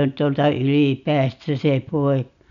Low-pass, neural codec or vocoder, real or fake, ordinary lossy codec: 7.2 kHz; none; real; MP3, 96 kbps